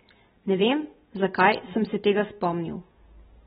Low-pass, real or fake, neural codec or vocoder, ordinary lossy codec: 7.2 kHz; real; none; AAC, 16 kbps